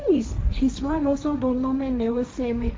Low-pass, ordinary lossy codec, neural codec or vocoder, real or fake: none; none; codec, 16 kHz, 1.1 kbps, Voila-Tokenizer; fake